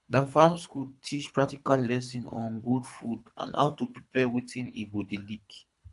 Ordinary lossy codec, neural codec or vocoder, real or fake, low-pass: AAC, 96 kbps; codec, 24 kHz, 3 kbps, HILCodec; fake; 10.8 kHz